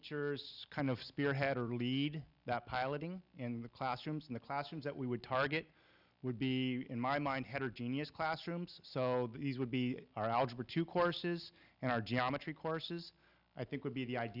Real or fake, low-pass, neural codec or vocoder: real; 5.4 kHz; none